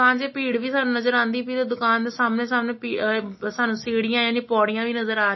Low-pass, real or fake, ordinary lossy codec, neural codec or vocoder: 7.2 kHz; real; MP3, 24 kbps; none